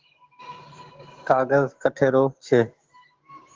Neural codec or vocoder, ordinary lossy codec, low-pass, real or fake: codec, 16 kHz, 6 kbps, DAC; Opus, 16 kbps; 7.2 kHz; fake